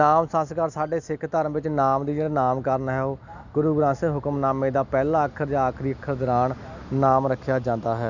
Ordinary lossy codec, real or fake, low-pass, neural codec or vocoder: none; real; 7.2 kHz; none